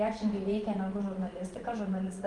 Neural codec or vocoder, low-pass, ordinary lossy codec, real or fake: vocoder, 24 kHz, 100 mel bands, Vocos; 10.8 kHz; Opus, 24 kbps; fake